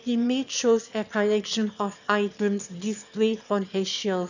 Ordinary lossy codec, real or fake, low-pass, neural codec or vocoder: none; fake; 7.2 kHz; autoencoder, 22.05 kHz, a latent of 192 numbers a frame, VITS, trained on one speaker